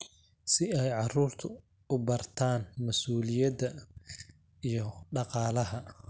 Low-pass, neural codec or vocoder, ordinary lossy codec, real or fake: none; none; none; real